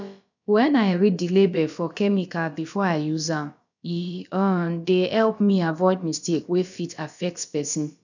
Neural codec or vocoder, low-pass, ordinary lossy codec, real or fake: codec, 16 kHz, about 1 kbps, DyCAST, with the encoder's durations; 7.2 kHz; none; fake